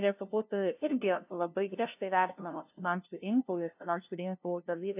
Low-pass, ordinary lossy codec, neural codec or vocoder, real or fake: 3.6 kHz; AAC, 32 kbps; codec, 16 kHz, 0.5 kbps, FunCodec, trained on LibriTTS, 25 frames a second; fake